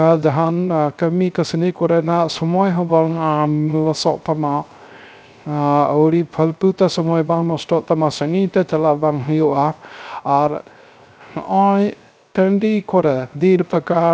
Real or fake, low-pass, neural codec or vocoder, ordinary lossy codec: fake; none; codec, 16 kHz, 0.3 kbps, FocalCodec; none